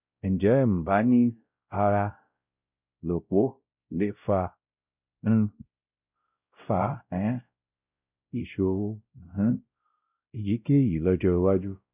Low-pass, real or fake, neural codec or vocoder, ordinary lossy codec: 3.6 kHz; fake; codec, 16 kHz, 0.5 kbps, X-Codec, WavLM features, trained on Multilingual LibriSpeech; none